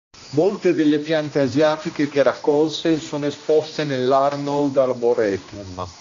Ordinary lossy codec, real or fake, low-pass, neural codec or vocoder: AAC, 48 kbps; fake; 7.2 kHz; codec, 16 kHz, 1 kbps, X-Codec, HuBERT features, trained on general audio